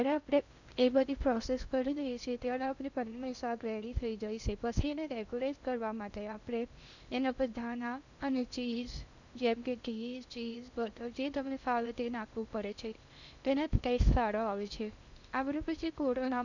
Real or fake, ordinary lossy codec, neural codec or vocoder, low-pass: fake; none; codec, 16 kHz in and 24 kHz out, 0.6 kbps, FocalCodec, streaming, 2048 codes; 7.2 kHz